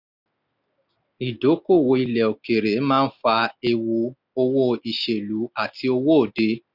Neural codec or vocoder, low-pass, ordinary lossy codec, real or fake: none; 5.4 kHz; none; real